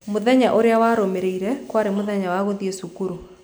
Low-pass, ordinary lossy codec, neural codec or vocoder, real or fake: none; none; none; real